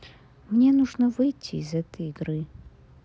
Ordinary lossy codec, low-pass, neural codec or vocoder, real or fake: none; none; none; real